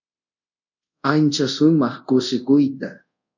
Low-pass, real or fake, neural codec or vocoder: 7.2 kHz; fake; codec, 24 kHz, 0.5 kbps, DualCodec